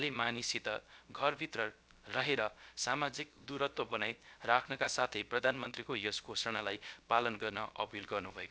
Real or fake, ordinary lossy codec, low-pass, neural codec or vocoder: fake; none; none; codec, 16 kHz, about 1 kbps, DyCAST, with the encoder's durations